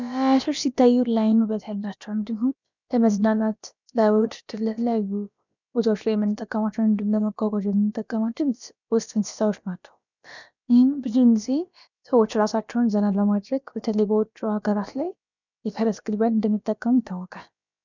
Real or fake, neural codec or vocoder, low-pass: fake; codec, 16 kHz, about 1 kbps, DyCAST, with the encoder's durations; 7.2 kHz